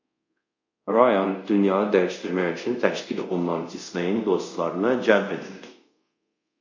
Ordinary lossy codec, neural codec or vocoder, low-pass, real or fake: MP3, 64 kbps; codec, 24 kHz, 0.5 kbps, DualCodec; 7.2 kHz; fake